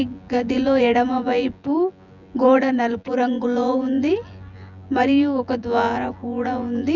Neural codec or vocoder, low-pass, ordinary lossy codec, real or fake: vocoder, 24 kHz, 100 mel bands, Vocos; 7.2 kHz; none; fake